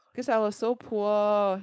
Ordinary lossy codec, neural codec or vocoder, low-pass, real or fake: none; codec, 16 kHz, 4.8 kbps, FACodec; none; fake